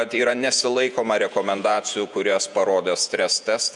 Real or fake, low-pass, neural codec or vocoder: fake; 10.8 kHz; vocoder, 48 kHz, 128 mel bands, Vocos